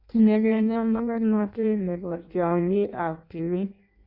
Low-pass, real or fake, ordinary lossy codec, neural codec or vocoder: 5.4 kHz; fake; Opus, 64 kbps; codec, 16 kHz in and 24 kHz out, 0.6 kbps, FireRedTTS-2 codec